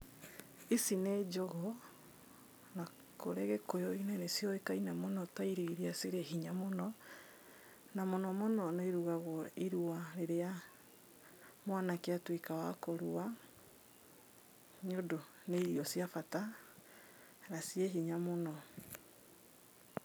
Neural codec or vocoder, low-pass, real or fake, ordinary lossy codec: none; none; real; none